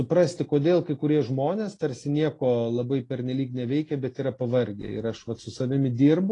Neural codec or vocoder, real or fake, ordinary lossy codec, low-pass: none; real; AAC, 32 kbps; 10.8 kHz